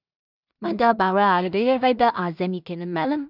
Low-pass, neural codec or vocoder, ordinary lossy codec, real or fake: 5.4 kHz; codec, 16 kHz in and 24 kHz out, 0.4 kbps, LongCat-Audio-Codec, two codebook decoder; none; fake